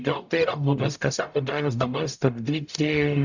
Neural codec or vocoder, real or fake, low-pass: codec, 44.1 kHz, 0.9 kbps, DAC; fake; 7.2 kHz